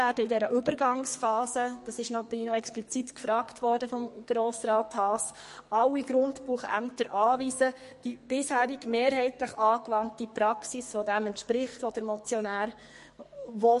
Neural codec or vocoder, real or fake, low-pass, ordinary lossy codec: codec, 44.1 kHz, 2.6 kbps, SNAC; fake; 14.4 kHz; MP3, 48 kbps